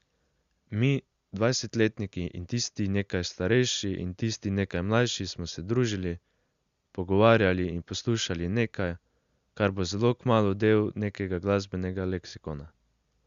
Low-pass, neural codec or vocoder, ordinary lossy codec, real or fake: 7.2 kHz; none; Opus, 64 kbps; real